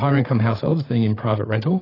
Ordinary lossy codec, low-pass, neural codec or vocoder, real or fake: AAC, 24 kbps; 5.4 kHz; codec, 16 kHz, 8 kbps, FreqCodec, larger model; fake